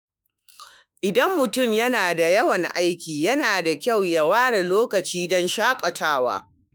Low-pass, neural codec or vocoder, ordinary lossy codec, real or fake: none; autoencoder, 48 kHz, 32 numbers a frame, DAC-VAE, trained on Japanese speech; none; fake